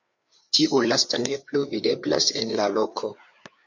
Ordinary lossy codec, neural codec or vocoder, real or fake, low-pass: MP3, 48 kbps; codec, 16 kHz, 4 kbps, FreqCodec, larger model; fake; 7.2 kHz